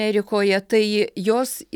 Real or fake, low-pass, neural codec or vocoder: real; 19.8 kHz; none